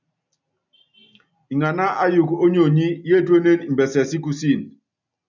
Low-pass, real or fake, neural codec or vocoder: 7.2 kHz; real; none